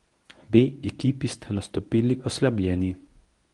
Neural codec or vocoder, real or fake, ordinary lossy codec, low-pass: codec, 24 kHz, 0.9 kbps, WavTokenizer, medium speech release version 1; fake; Opus, 24 kbps; 10.8 kHz